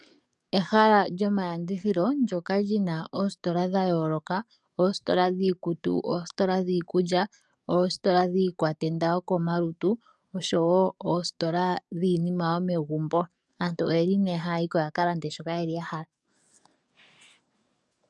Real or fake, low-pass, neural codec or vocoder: fake; 10.8 kHz; codec, 44.1 kHz, 7.8 kbps, DAC